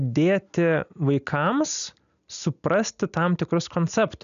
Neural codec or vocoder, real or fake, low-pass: none; real; 7.2 kHz